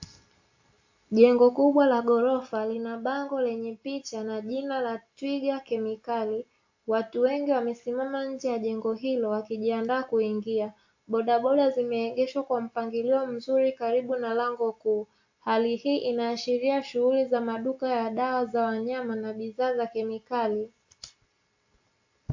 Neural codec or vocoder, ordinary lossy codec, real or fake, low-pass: none; AAC, 48 kbps; real; 7.2 kHz